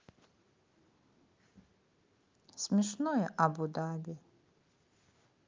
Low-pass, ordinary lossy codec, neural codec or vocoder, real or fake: 7.2 kHz; Opus, 24 kbps; none; real